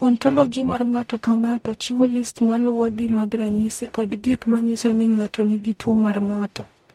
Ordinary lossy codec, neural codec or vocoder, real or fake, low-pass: MP3, 64 kbps; codec, 44.1 kHz, 0.9 kbps, DAC; fake; 19.8 kHz